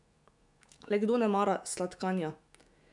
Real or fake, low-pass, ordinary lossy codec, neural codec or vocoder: fake; 10.8 kHz; none; autoencoder, 48 kHz, 128 numbers a frame, DAC-VAE, trained on Japanese speech